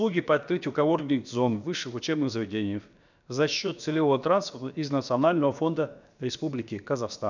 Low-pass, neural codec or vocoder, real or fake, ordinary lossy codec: 7.2 kHz; codec, 16 kHz, about 1 kbps, DyCAST, with the encoder's durations; fake; none